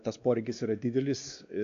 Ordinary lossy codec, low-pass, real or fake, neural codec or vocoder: AAC, 64 kbps; 7.2 kHz; fake; codec, 16 kHz, 2 kbps, X-Codec, WavLM features, trained on Multilingual LibriSpeech